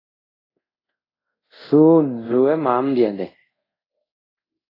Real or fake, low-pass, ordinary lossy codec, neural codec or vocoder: fake; 5.4 kHz; AAC, 24 kbps; codec, 24 kHz, 0.5 kbps, DualCodec